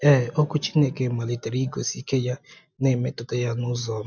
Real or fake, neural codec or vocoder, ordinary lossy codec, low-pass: real; none; none; 7.2 kHz